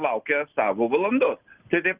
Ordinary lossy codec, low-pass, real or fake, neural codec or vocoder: Opus, 24 kbps; 3.6 kHz; real; none